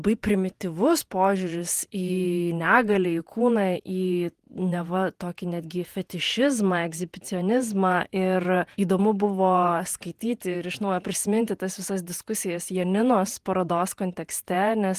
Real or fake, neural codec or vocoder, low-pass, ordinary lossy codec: fake; vocoder, 48 kHz, 128 mel bands, Vocos; 14.4 kHz; Opus, 24 kbps